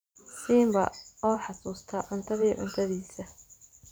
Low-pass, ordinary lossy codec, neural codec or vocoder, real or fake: none; none; vocoder, 44.1 kHz, 128 mel bands every 512 samples, BigVGAN v2; fake